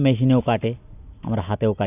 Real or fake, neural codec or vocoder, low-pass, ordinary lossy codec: real; none; 3.6 kHz; none